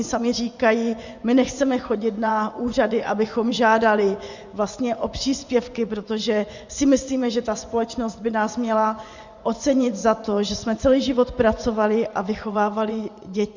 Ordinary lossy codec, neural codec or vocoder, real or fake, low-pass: Opus, 64 kbps; vocoder, 24 kHz, 100 mel bands, Vocos; fake; 7.2 kHz